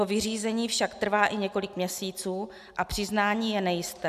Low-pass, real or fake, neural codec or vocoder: 14.4 kHz; real; none